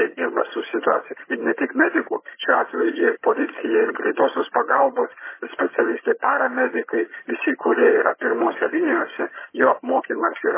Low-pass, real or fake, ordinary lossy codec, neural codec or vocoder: 3.6 kHz; fake; MP3, 16 kbps; vocoder, 22.05 kHz, 80 mel bands, HiFi-GAN